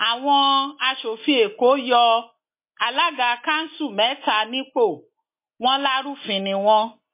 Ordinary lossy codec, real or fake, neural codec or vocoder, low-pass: MP3, 24 kbps; real; none; 3.6 kHz